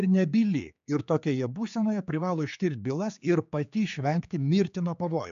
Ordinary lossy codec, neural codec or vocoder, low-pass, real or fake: MP3, 64 kbps; codec, 16 kHz, 4 kbps, X-Codec, HuBERT features, trained on general audio; 7.2 kHz; fake